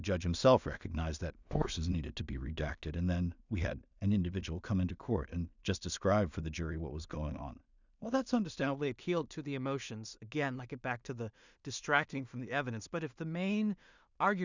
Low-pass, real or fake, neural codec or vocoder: 7.2 kHz; fake; codec, 16 kHz in and 24 kHz out, 0.4 kbps, LongCat-Audio-Codec, two codebook decoder